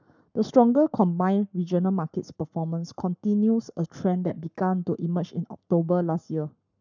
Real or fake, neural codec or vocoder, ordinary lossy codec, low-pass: fake; codec, 44.1 kHz, 7.8 kbps, Pupu-Codec; none; 7.2 kHz